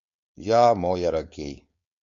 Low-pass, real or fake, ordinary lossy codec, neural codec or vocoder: 7.2 kHz; fake; MP3, 48 kbps; codec, 16 kHz, 4.8 kbps, FACodec